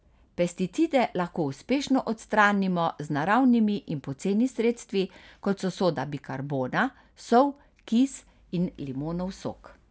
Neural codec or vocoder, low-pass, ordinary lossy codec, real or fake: none; none; none; real